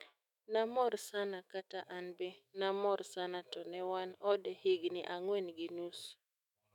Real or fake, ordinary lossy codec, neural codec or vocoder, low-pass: fake; none; autoencoder, 48 kHz, 128 numbers a frame, DAC-VAE, trained on Japanese speech; 19.8 kHz